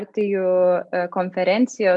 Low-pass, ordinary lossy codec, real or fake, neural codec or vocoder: 10.8 kHz; MP3, 96 kbps; real; none